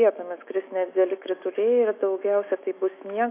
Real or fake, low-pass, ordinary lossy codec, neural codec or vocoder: fake; 3.6 kHz; AAC, 24 kbps; autoencoder, 48 kHz, 128 numbers a frame, DAC-VAE, trained on Japanese speech